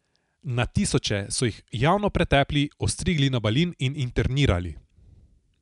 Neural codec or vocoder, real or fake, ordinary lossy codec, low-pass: none; real; none; 10.8 kHz